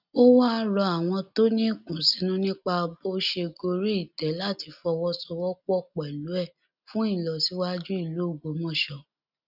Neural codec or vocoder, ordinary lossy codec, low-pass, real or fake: none; none; 5.4 kHz; real